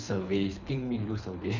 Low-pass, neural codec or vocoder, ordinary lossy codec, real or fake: 7.2 kHz; codec, 24 kHz, 3 kbps, HILCodec; none; fake